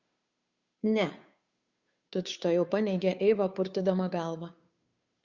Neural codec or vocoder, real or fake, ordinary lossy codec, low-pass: codec, 16 kHz, 2 kbps, FunCodec, trained on Chinese and English, 25 frames a second; fake; Opus, 64 kbps; 7.2 kHz